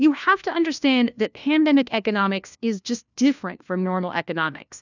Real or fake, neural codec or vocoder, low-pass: fake; codec, 16 kHz, 1 kbps, FunCodec, trained on LibriTTS, 50 frames a second; 7.2 kHz